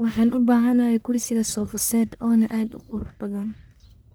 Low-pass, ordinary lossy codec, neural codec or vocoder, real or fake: none; none; codec, 44.1 kHz, 1.7 kbps, Pupu-Codec; fake